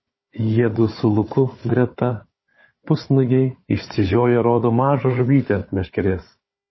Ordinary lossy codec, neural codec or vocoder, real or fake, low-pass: MP3, 24 kbps; codec, 16 kHz, 4 kbps, FunCodec, trained on Chinese and English, 50 frames a second; fake; 7.2 kHz